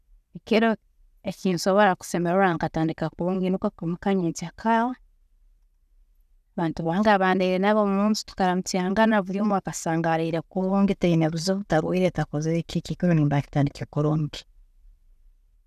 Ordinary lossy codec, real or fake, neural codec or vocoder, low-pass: none; real; none; 14.4 kHz